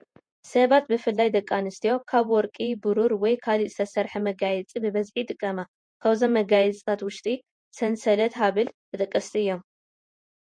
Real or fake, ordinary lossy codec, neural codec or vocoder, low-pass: fake; MP3, 48 kbps; vocoder, 48 kHz, 128 mel bands, Vocos; 9.9 kHz